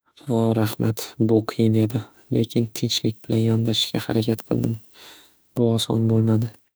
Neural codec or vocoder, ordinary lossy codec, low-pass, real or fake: autoencoder, 48 kHz, 32 numbers a frame, DAC-VAE, trained on Japanese speech; none; none; fake